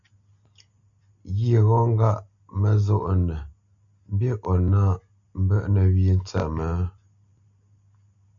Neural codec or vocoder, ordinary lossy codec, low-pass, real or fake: none; MP3, 96 kbps; 7.2 kHz; real